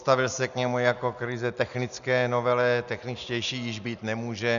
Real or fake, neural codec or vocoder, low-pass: real; none; 7.2 kHz